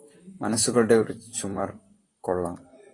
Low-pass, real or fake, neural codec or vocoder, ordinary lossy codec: 10.8 kHz; fake; vocoder, 44.1 kHz, 128 mel bands, Pupu-Vocoder; AAC, 32 kbps